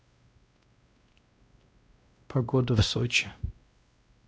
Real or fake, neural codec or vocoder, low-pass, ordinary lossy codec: fake; codec, 16 kHz, 1 kbps, X-Codec, WavLM features, trained on Multilingual LibriSpeech; none; none